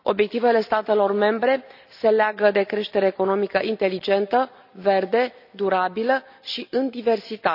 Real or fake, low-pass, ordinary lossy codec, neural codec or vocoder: real; 5.4 kHz; none; none